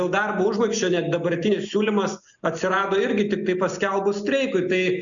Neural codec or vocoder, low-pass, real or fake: none; 7.2 kHz; real